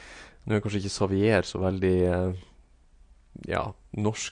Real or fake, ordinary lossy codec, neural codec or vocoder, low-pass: real; MP3, 48 kbps; none; 9.9 kHz